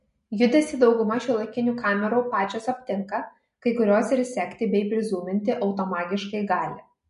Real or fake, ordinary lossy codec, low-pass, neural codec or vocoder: real; MP3, 48 kbps; 10.8 kHz; none